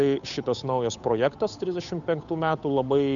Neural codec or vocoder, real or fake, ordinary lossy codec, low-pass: codec, 16 kHz, 8 kbps, FunCodec, trained on Chinese and English, 25 frames a second; fake; MP3, 96 kbps; 7.2 kHz